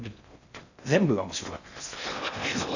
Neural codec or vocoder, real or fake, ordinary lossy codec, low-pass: codec, 16 kHz in and 24 kHz out, 0.6 kbps, FocalCodec, streaming, 4096 codes; fake; none; 7.2 kHz